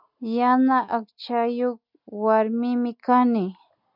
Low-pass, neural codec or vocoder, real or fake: 5.4 kHz; none; real